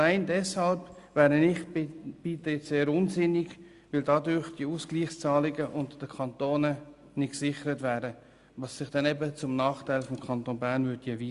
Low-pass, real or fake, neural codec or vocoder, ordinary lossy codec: 10.8 kHz; real; none; Opus, 64 kbps